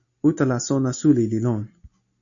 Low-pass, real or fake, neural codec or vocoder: 7.2 kHz; real; none